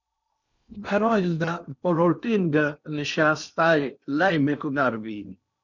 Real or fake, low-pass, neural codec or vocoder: fake; 7.2 kHz; codec, 16 kHz in and 24 kHz out, 0.8 kbps, FocalCodec, streaming, 65536 codes